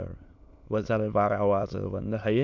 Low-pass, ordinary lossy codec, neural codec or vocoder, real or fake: 7.2 kHz; none; autoencoder, 22.05 kHz, a latent of 192 numbers a frame, VITS, trained on many speakers; fake